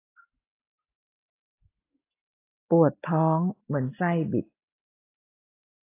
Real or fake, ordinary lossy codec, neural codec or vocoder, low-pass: real; AAC, 24 kbps; none; 3.6 kHz